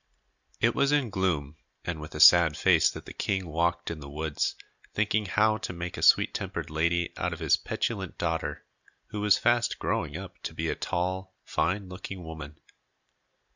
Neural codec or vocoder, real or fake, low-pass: none; real; 7.2 kHz